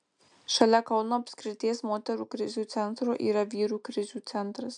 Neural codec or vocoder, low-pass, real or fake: none; 9.9 kHz; real